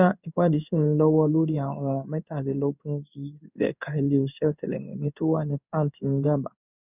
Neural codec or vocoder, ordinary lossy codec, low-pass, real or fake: codec, 16 kHz in and 24 kHz out, 1 kbps, XY-Tokenizer; none; 3.6 kHz; fake